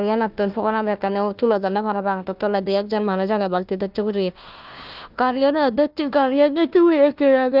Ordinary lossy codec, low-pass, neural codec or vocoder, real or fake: Opus, 32 kbps; 5.4 kHz; codec, 16 kHz, 1 kbps, FunCodec, trained on Chinese and English, 50 frames a second; fake